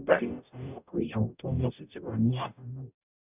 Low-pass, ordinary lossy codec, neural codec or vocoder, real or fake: 3.6 kHz; none; codec, 44.1 kHz, 0.9 kbps, DAC; fake